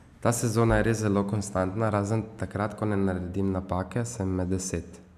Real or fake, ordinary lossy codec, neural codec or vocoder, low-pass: real; none; none; 14.4 kHz